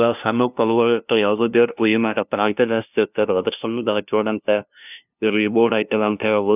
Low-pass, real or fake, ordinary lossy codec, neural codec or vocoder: 3.6 kHz; fake; none; codec, 16 kHz, 0.5 kbps, FunCodec, trained on LibriTTS, 25 frames a second